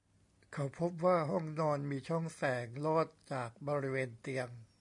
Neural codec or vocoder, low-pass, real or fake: none; 10.8 kHz; real